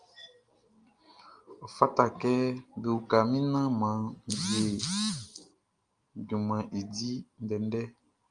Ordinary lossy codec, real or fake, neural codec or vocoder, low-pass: Opus, 32 kbps; real; none; 9.9 kHz